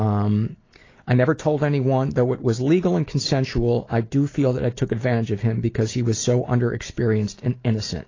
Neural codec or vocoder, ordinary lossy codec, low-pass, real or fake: none; AAC, 32 kbps; 7.2 kHz; real